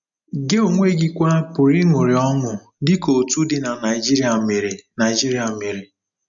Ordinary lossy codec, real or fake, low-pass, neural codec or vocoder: none; real; 7.2 kHz; none